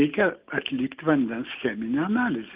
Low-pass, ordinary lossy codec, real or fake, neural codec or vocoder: 3.6 kHz; Opus, 24 kbps; real; none